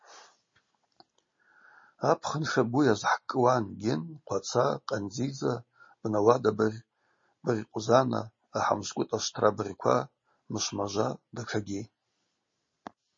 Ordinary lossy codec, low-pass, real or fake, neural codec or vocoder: MP3, 32 kbps; 7.2 kHz; real; none